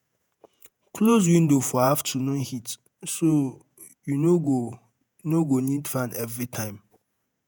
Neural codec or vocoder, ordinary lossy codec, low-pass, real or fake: vocoder, 48 kHz, 128 mel bands, Vocos; none; none; fake